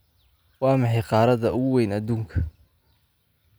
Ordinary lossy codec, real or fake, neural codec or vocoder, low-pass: none; real; none; none